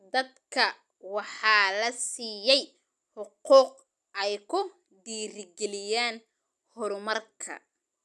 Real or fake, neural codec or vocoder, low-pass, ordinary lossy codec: real; none; none; none